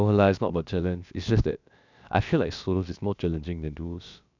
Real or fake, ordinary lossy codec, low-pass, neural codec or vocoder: fake; none; 7.2 kHz; codec, 16 kHz, about 1 kbps, DyCAST, with the encoder's durations